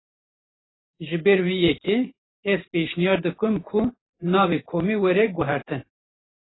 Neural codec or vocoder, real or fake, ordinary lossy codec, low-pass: vocoder, 44.1 kHz, 128 mel bands every 512 samples, BigVGAN v2; fake; AAC, 16 kbps; 7.2 kHz